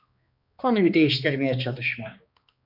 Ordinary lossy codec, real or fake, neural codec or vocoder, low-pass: AAC, 48 kbps; fake; codec, 16 kHz, 2 kbps, X-Codec, HuBERT features, trained on balanced general audio; 5.4 kHz